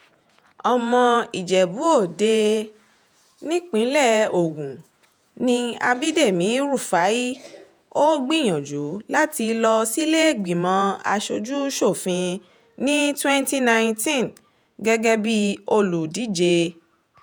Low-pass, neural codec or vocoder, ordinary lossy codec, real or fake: none; vocoder, 48 kHz, 128 mel bands, Vocos; none; fake